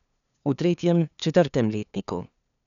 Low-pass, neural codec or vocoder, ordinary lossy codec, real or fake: 7.2 kHz; codec, 16 kHz, 2 kbps, FunCodec, trained on LibriTTS, 25 frames a second; none; fake